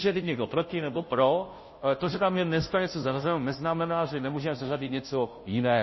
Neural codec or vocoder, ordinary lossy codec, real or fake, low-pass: codec, 16 kHz, 0.5 kbps, FunCodec, trained on Chinese and English, 25 frames a second; MP3, 24 kbps; fake; 7.2 kHz